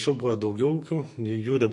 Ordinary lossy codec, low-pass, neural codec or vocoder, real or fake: MP3, 48 kbps; 10.8 kHz; codec, 44.1 kHz, 2.6 kbps, SNAC; fake